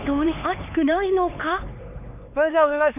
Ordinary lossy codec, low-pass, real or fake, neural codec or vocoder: none; 3.6 kHz; fake; codec, 16 kHz, 4 kbps, X-Codec, HuBERT features, trained on LibriSpeech